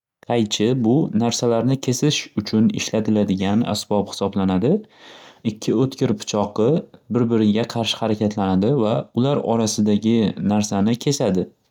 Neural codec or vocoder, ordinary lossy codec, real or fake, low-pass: none; none; real; 19.8 kHz